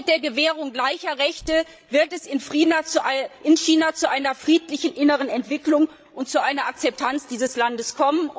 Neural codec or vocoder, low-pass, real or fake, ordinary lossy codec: codec, 16 kHz, 16 kbps, FreqCodec, larger model; none; fake; none